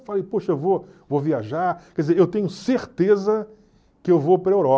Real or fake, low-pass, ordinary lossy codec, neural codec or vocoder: real; none; none; none